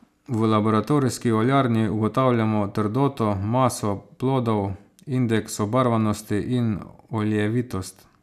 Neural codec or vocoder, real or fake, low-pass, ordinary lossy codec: none; real; 14.4 kHz; none